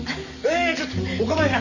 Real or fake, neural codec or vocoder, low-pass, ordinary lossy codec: real; none; 7.2 kHz; none